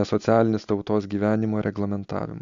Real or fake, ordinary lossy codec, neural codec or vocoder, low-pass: real; AAC, 64 kbps; none; 7.2 kHz